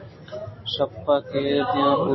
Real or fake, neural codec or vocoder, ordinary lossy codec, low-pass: real; none; MP3, 24 kbps; 7.2 kHz